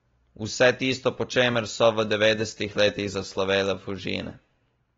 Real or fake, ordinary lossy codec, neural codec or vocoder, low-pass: real; AAC, 24 kbps; none; 7.2 kHz